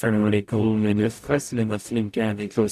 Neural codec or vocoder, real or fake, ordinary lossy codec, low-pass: codec, 44.1 kHz, 0.9 kbps, DAC; fake; AAC, 96 kbps; 14.4 kHz